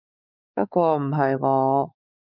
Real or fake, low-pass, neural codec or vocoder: fake; 5.4 kHz; codec, 16 kHz, 4 kbps, X-Codec, WavLM features, trained on Multilingual LibriSpeech